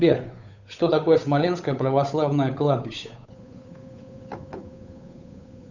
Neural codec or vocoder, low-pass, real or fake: codec, 16 kHz, 8 kbps, FunCodec, trained on Chinese and English, 25 frames a second; 7.2 kHz; fake